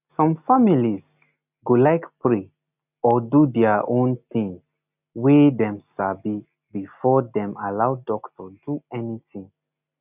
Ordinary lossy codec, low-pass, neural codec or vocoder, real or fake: none; 3.6 kHz; none; real